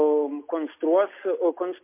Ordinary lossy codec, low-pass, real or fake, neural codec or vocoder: MP3, 24 kbps; 3.6 kHz; real; none